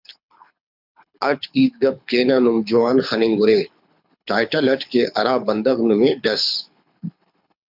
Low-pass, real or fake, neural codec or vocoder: 5.4 kHz; fake; codec, 24 kHz, 6 kbps, HILCodec